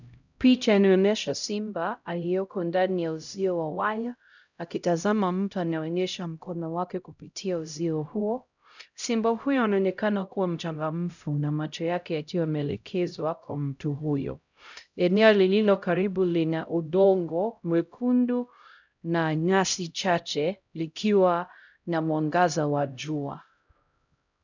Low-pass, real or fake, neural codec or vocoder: 7.2 kHz; fake; codec, 16 kHz, 0.5 kbps, X-Codec, HuBERT features, trained on LibriSpeech